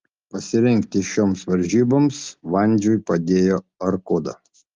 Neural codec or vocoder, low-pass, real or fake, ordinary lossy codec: none; 7.2 kHz; real; Opus, 32 kbps